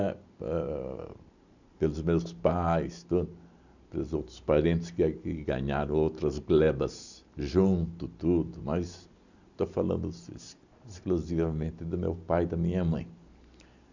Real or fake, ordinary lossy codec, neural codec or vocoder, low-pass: real; none; none; 7.2 kHz